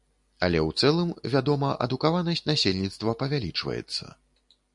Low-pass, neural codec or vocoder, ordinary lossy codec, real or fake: 10.8 kHz; none; MP3, 96 kbps; real